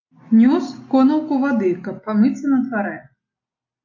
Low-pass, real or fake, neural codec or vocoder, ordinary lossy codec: 7.2 kHz; real; none; AAC, 48 kbps